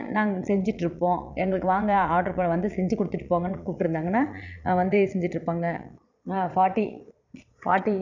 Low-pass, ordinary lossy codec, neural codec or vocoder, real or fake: 7.2 kHz; none; vocoder, 22.05 kHz, 80 mel bands, Vocos; fake